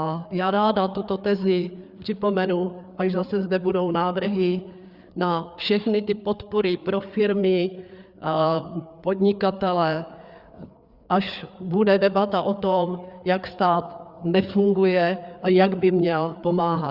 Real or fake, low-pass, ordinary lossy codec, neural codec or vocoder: fake; 5.4 kHz; Opus, 64 kbps; codec, 16 kHz, 4 kbps, FreqCodec, larger model